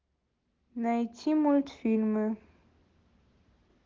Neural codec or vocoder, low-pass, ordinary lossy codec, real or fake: none; 7.2 kHz; Opus, 16 kbps; real